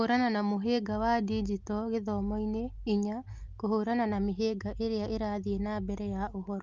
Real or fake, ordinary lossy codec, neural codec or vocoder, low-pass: real; Opus, 32 kbps; none; 7.2 kHz